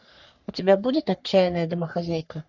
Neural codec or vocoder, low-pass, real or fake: codec, 44.1 kHz, 3.4 kbps, Pupu-Codec; 7.2 kHz; fake